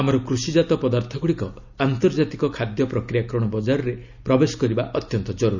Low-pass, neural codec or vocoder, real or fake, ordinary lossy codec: 7.2 kHz; none; real; none